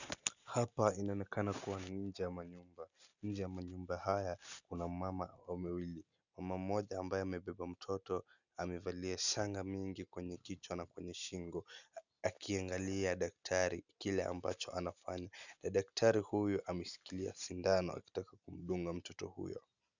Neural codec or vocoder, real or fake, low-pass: none; real; 7.2 kHz